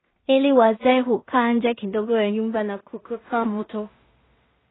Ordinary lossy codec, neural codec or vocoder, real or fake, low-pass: AAC, 16 kbps; codec, 16 kHz in and 24 kHz out, 0.4 kbps, LongCat-Audio-Codec, two codebook decoder; fake; 7.2 kHz